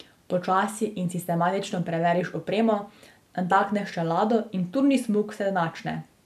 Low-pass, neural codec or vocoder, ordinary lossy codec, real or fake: 14.4 kHz; none; none; real